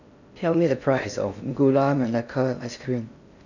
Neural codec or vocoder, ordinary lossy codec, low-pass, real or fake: codec, 16 kHz in and 24 kHz out, 0.6 kbps, FocalCodec, streaming, 4096 codes; none; 7.2 kHz; fake